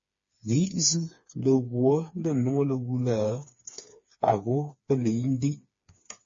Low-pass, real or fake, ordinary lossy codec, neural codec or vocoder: 7.2 kHz; fake; MP3, 32 kbps; codec, 16 kHz, 4 kbps, FreqCodec, smaller model